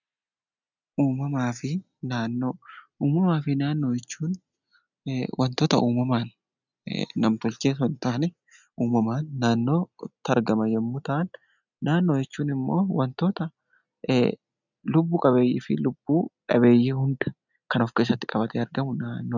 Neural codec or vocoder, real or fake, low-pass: none; real; 7.2 kHz